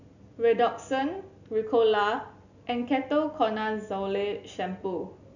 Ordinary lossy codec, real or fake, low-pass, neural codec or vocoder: none; real; 7.2 kHz; none